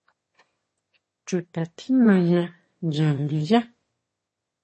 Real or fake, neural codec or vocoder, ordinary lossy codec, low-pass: fake; autoencoder, 22.05 kHz, a latent of 192 numbers a frame, VITS, trained on one speaker; MP3, 32 kbps; 9.9 kHz